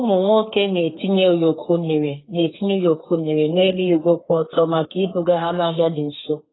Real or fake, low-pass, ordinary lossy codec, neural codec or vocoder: fake; 7.2 kHz; AAC, 16 kbps; codec, 32 kHz, 1.9 kbps, SNAC